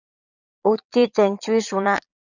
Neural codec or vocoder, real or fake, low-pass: vocoder, 22.05 kHz, 80 mel bands, Vocos; fake; 7.2 kHz